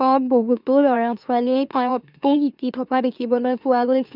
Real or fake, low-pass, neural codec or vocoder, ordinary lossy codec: fake; 5.4 kHz; autoencoder, 44.1 kHz, a latent of 192 numbers a frame, MeloTTS; none